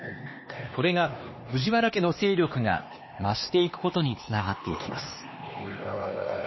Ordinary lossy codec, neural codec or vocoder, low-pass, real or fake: MP3, 24 kbps; codec, 16 kHz, 2 kbps, X-Codec, HuBERT features, trained on LibriSpeech; 7.2 kHz; fake